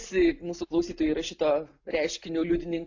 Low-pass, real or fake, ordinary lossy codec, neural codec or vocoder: 7.2 kHz; real; AAC, 48 kbps; none